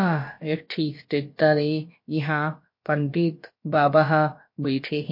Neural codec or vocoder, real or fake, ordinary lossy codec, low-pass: codec, 16 kHz, about 1 kbps, DyCAST, with the encoder's durations; fake; MP3, 32 kbps; 5.4 kHz